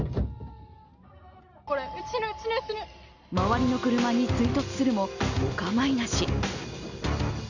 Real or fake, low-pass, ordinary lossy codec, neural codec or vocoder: real; 7.2 kHz; none; none